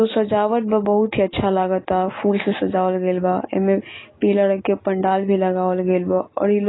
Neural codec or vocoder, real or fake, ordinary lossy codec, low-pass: none; real; AAC, 16 kbps; 7.2 kHz